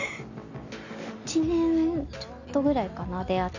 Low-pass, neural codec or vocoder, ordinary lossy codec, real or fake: 7.2 kHz; none; none; real